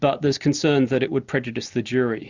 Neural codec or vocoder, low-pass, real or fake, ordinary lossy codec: none; 7.2 kHz; real; Opus, 64 kbps